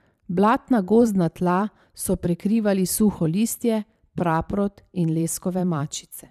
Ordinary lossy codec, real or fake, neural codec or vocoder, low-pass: none; fake; vocoder, 44.1 kHz, 128 mel bands every 512 samples, BigVGAN v2; 14.4 kHz